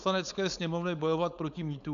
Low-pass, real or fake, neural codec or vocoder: 7.2 kHz; fake; codec, 16 kHz, 4.8 kbps, FACodec